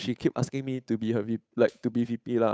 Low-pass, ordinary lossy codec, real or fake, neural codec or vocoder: none; none; fake; codec, 16 kHz, 8 kbps, FunCodec, trained on Chinese and English, 25 frames a second